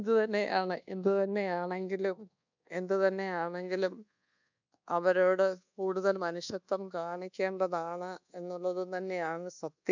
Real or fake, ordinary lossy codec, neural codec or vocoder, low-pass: fake; none; codec, 24 kHz, 1.2 kbps, DualCodec; 7.2 kHz